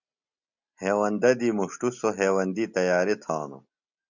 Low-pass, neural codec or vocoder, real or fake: 7.2 kHz; none; real